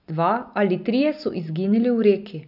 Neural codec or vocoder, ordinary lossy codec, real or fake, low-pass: none; none; real; 5.4 kHz